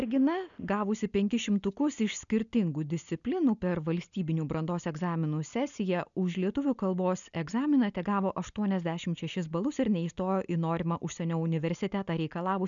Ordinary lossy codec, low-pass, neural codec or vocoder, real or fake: MP3, 96 kbps; 7.2 kHz; none; real